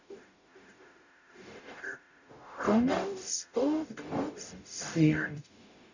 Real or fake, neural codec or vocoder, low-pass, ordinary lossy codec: fake; codec, 44.1 kHz, 0.9 kbps, DAC; 7.2 kHz; none